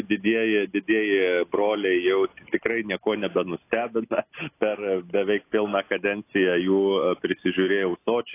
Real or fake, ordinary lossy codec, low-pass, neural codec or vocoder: real; AAC, 24 kbps; 3.6 kHz; none